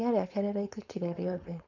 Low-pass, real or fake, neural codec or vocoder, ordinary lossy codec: 7.2 kHz; fake; codec, 16 kHz, 4.8 kbps, FACodec; none